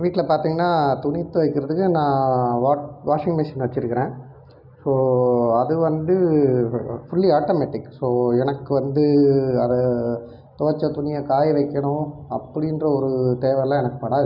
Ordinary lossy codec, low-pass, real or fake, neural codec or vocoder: none; 5.4 kHz; real; none